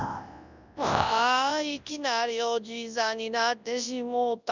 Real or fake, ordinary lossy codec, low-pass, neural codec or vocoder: fake; none; 7.2 kHz; codec, 24 kHz, 0.9 kbps, WavTokenizer, large speech release